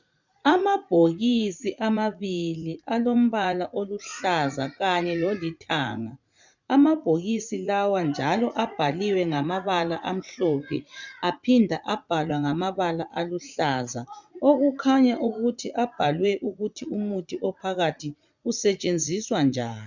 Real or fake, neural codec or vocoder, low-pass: fake; vocoder, 44.1 kHz, 80 mel bands, Vocos; 7.2 kHz